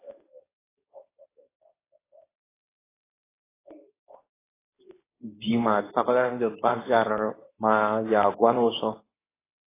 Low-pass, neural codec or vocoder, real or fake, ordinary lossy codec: 3.6 kHz; codec, 24 kHz, 0.9 kbps, WavTokenizer, medium speech release version 2; fake; AAC, 16 kbps